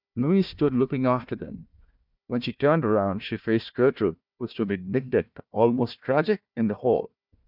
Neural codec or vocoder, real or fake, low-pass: codec, 16 kHz, 1 kbps, FunCodec, trained on Chinese and English, 50 frames a second; fake; 5.4 kHz